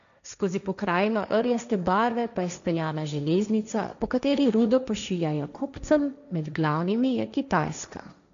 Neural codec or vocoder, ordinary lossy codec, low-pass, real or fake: codec, 16 kHz, 1.1 kbps, Voila-Tokenizer; none; 7.2 kHz; fake